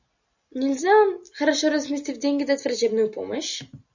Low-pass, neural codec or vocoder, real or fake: 7.2 kHz; none; real